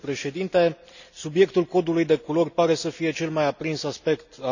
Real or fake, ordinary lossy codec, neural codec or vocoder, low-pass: real; none; none; 7.2 kHz